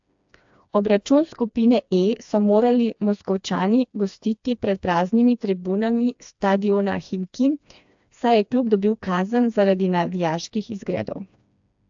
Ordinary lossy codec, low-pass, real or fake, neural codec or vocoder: AAC, 64 kbps; 7.2 kHz; fake; codec, 16 kHz, 2 kbps, FreqCodec, smaller model